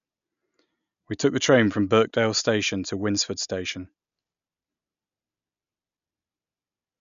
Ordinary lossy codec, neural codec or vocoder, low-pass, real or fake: none; none; 7.2 kHz; real